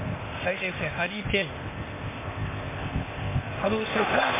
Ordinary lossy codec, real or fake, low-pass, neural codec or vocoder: MP3, 16 kbps; fake; 3.6 kHz; codec, 16 kHz, 0.8 kbps, ZipCodec